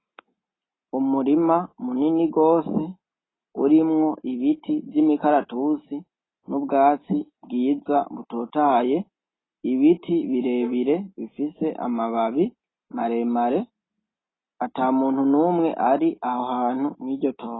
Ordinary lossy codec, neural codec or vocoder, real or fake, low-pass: AAC, 16 kbps; none; real; 7.2 kHz